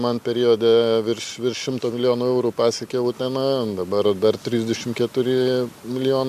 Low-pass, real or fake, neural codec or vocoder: 14.4 kHz; real; none